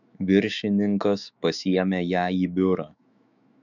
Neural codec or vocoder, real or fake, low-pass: autoencoder, 48 kHz, 128 numbers a frame, DAC-VAE, trained on Japanese speech; fake; 7.2 kHz